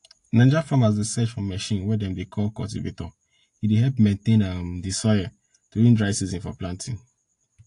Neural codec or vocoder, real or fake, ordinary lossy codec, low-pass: none; real; AAC, 48 kbps; 10.8 kHz